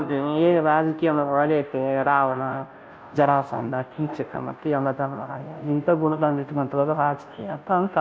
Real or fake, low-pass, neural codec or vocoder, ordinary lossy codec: fake; none; codec, 16 kHz, 0.5 kbps, FunCodec, trained on Chinese and English, 25 frames a second; none